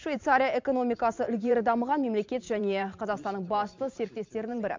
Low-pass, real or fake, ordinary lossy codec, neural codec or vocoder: 7.2 kHz; fake; MP3, 64 kbps; vocoder, 44.1 kHz, 128 mel bands every 512 samples, BigVGAN v2